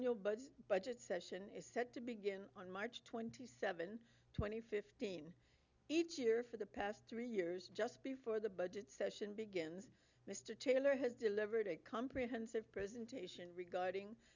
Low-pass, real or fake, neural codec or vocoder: 7.2 kHz; real; none